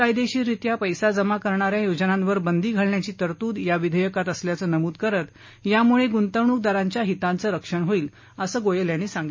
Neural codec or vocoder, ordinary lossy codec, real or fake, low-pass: none; MP3, 32 kbps; real; 7.2 kHz